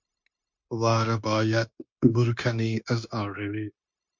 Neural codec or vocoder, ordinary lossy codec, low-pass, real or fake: codec, 16 kHz, 0.9 kbps, LongCat-Audio-Codec; MP3, 48 kbps; 7.2 kHz; fake